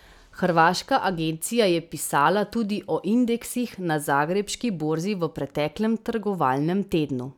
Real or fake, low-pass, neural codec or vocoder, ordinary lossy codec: real; 19.8 kHz; none; none